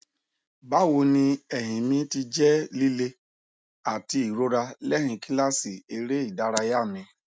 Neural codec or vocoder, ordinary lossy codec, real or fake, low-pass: none; none; real; none